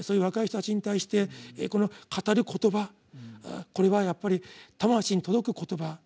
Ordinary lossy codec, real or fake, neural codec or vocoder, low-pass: none; real; none; none